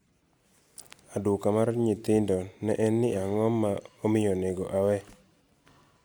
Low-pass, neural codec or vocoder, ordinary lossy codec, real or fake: none; none; none; real